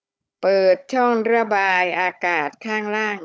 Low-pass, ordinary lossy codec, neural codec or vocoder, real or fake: none; none; codec, 16 kHz, 16 kbps, FunCodec, trained on Chinese and English, 50 frames a second; fake